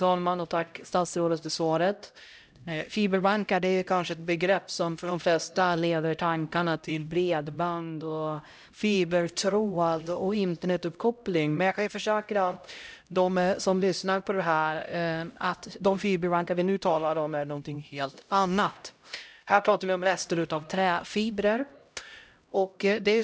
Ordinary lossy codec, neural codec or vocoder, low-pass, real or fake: none; codec, 16 kHz, 0.5 kbps, X-Codec, HuBERT features, trained on LibriSpeech; none; fake